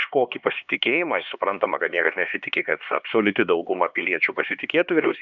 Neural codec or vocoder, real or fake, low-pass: codec, 16 kHz, 2 kbps, X-Codec, HuBERT features, trained on LibriSpeech; fake; 7.2 kHz